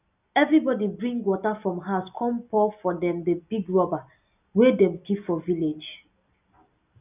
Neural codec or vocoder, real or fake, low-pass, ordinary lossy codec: none; real; 3.6 kHz; none